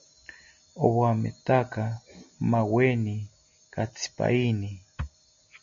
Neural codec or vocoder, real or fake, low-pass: none; real; 7.2 kHz